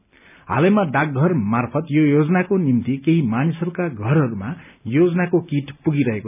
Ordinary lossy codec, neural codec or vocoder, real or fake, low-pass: none; none; real; 3.6 kHz